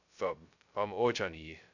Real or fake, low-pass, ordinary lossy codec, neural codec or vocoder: fake; 7.2 kHz; none; codec, 16 kHz, 0.2 kbps, FocalCodec